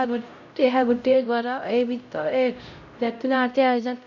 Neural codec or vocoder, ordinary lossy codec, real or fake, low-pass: codec, 16 kHz, 0.5 kbps, X-Codec, HuBERT features, trained on LibriSpeech; none; fake; 7.2 kHz